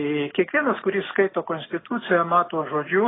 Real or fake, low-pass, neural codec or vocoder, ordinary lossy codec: real; 7.2 kHz; none; AAC, 16 kbps